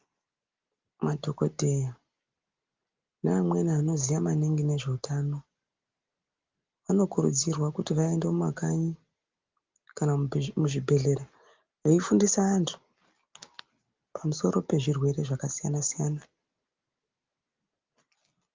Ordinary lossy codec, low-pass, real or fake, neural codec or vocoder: Opus, 32 kbps; 7.2 kHz; real; none